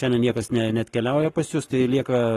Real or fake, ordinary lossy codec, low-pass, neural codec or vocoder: fake; AAC, 32 kbps; 19.8 kHz; codec, 44.1 kHz, 7.8 kbps, Pupu-Codec